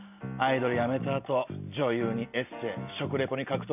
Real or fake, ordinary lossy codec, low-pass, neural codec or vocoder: real; none; 3.6 kHz; none